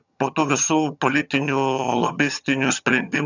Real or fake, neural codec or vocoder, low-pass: fake; vocoder, 22.05 kHz, 80 mel bands, HiFi-GAN; 7.2 kHz